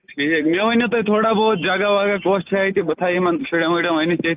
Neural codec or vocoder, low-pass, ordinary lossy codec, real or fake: none; 3.6 kHz; Opus, 32 kbps; real